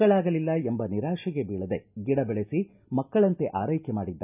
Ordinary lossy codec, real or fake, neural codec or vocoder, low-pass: none; real; none; 3.6 kHz